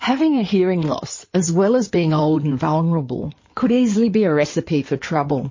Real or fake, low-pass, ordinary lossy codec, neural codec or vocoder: fake; 7.2 kHz; MP3, 32 kbps; codec, 16 kHz in and 24 kHz out, 2.2 kbps, FireRedTTS-2 codec